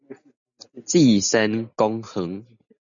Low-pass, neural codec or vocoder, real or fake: 7.2 kHz; none; real